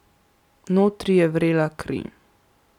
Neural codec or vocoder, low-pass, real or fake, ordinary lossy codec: none; 19.8 kHz; real; none